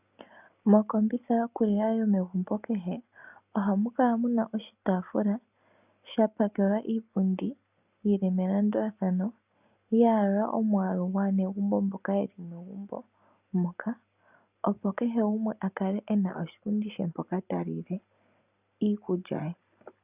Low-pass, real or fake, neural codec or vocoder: 3.6 kHz; real; none